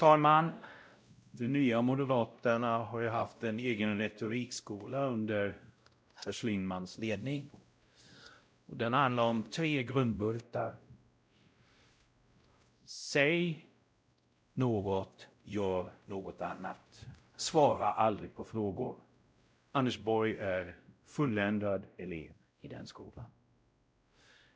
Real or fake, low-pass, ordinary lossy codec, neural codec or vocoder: fake; none; none; codec, 16 kHz, 0.5 kbps, X-Codec, WavLM features, trained on Multilingual LibriSpeech